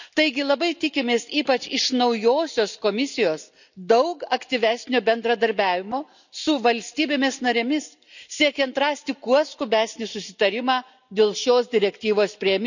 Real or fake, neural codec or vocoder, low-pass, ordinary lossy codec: real; none; 7.2 kHz; none